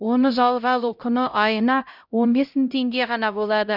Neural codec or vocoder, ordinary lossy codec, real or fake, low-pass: codec, 16 kHz, 0.5 kbps, X-Codec, HuBERT features, trained on LibriSpeech; none; fake; 5.4 kHz